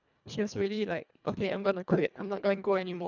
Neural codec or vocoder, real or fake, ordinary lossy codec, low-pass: codec, 24 kHz, 1.5 kbps, HILCodec; fake; none; 7.2 kHz